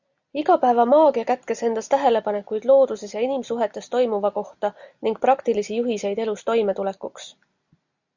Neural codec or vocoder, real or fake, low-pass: none; real; 7.2 kHz